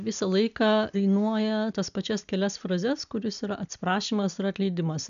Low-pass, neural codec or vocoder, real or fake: 7.2 kHz; none; real